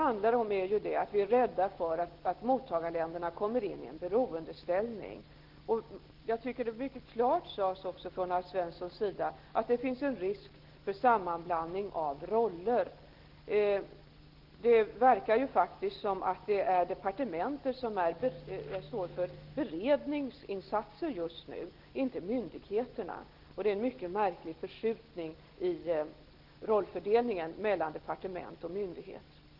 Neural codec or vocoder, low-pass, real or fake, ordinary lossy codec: none; 5.4 kHz; real; Opus, 16 kbps